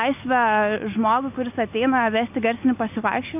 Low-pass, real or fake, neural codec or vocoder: 3.6 kHz; real; none